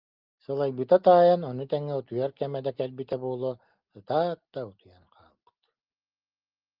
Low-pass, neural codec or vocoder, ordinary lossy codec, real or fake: 5.4 kHz; none; Opus, 32 kbps; real